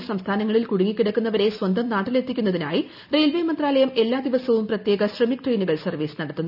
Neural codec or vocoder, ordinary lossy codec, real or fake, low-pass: none; none; real; 5.4 kHz